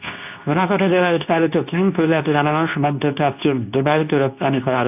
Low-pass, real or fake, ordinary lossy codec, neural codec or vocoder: 3.6 kHz; fake; none; codec, 24 kHz, 0.9 kbps, WavTokenizer, medium speech release version 2